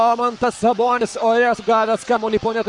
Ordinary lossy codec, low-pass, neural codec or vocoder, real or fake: MP3, 64 kbps; 10.8 kHz; codec, 44.1 kHz, 7.8 kbps, Pupu-Codec; fake